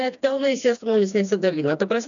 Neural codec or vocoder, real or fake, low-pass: codec, 16 kHz, 2 kbps, FreqCodec, smaller model; fake; 7.2 kHz